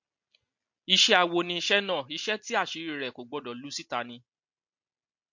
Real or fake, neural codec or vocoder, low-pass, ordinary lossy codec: real; none; 7.2 kHz; MP3, 48 kbps